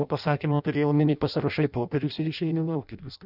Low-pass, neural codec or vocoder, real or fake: 5.4 kHz; codec, 16 kHz in and 24 kHz out, 0.6 kbps, FireRedTTS-2 codec; fake